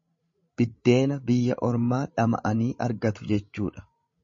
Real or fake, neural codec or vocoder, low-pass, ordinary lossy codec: fake; codec, 16 kHz, 16 kbps, FreqCodec, larger model; 7.2 kHz; MP3, 32 kbps